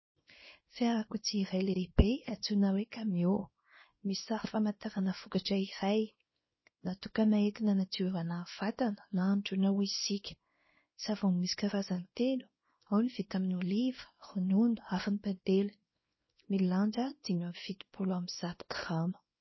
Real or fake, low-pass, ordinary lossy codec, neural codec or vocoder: fake; 7.2 kHz; MP3, 24 kbps; codec, 24 kHz, 0.9 kbps, WavTokenizer, small release